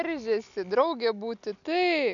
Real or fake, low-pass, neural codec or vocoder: real; 7.2 kHz; none